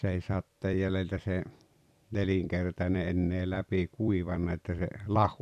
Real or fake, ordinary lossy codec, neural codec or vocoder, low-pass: fake; none; vocoder, 44.1 kHz, 128 mel bands every 256 samples, BigVGAN v2; 14.4 kHz